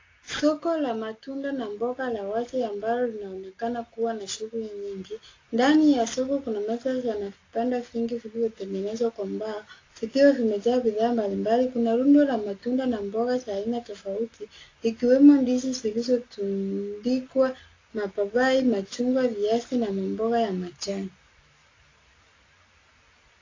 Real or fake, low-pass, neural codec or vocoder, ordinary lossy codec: real; 7.2 kHz; none; AAC, 32 kbps